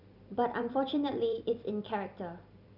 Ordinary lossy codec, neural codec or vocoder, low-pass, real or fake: none; vocoder, 44.1 kHz, 128 mel bands every 256 samples, BigVGAN v2; 5.4 kHz; fake